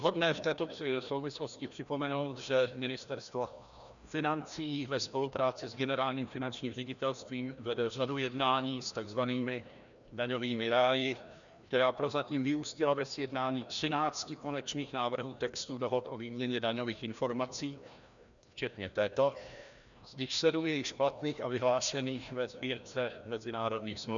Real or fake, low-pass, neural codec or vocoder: fake; 7.2 kHz; codec, 16 kHz, 1 kbps, FreqCodec, larger model